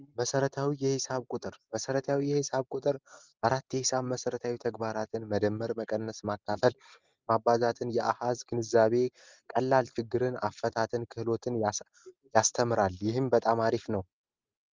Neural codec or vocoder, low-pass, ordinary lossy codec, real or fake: none; 7.2 kHz; Opus, 32 kbps; real